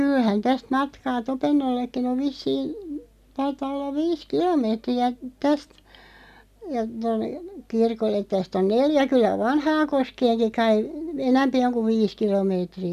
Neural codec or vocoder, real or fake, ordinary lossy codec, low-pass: none; real; none; 14.4 kHz